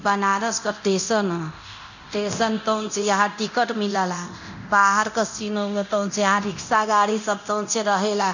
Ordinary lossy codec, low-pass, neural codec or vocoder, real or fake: none; 7.2 kHz; codec, 24 kHz, 0.9 kbps, DualCodec; fake